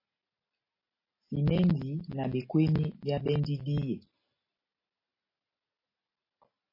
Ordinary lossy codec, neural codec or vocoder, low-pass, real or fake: MP3, 24 kbps; none; 5.4 kHz; real